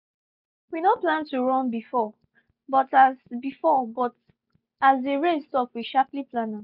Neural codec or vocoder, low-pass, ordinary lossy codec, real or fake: none; 5.4 kHz; none; real